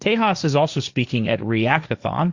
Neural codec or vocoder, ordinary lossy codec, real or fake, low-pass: codec, 16 kHz, 1.1 kbps, Voila-Tokenizer; Opus, 64 kbps; fake; 7.2 kHz